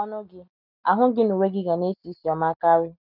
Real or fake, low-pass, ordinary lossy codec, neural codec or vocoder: real; 5.4 kHz; none; none